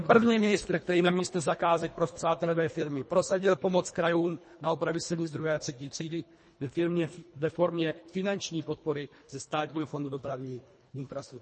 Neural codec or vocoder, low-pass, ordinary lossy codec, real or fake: codec, 24 kHz, 1.5 kbps, HILCodec; 10.8 kHz; MP3, 32 kbps; fake